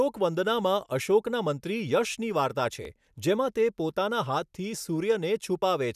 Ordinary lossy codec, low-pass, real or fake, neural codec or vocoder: none; 14.4 kHz; real; none